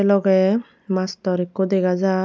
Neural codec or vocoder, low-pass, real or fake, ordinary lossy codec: none; none; real; none